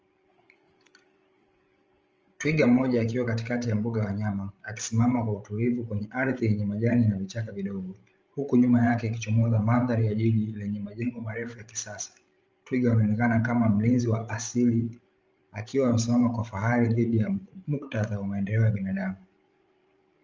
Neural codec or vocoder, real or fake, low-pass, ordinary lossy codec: codec, 16 kHz, 16 kbps, FreqCodec, larger model; fake; 7.2 kHz; Opus, 24 kbps